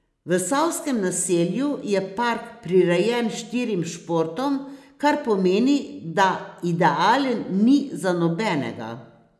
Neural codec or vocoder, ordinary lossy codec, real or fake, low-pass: none; none; real; none